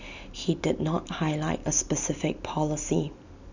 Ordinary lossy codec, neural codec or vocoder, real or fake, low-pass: none; none; real; 7.2 kHz